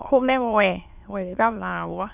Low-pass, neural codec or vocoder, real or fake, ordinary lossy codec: 3.6 kHz; autoencoder, 22.05 kHz, a latent of 192 numbers a frame, VITS, trained on many speakers; fake; none